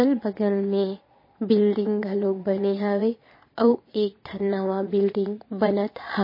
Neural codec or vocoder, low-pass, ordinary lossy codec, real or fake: vocoder, 22.05 kHz, 80 mel bands, Vocos; 5.4 kHz; MP3, 24 kbps; fake